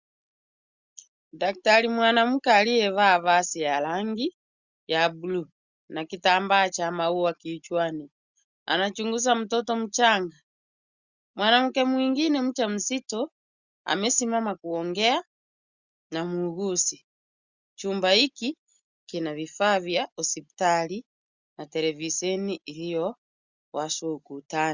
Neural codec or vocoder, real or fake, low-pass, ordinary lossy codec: none; real; 7.2 kHz; Opus, 64 kbps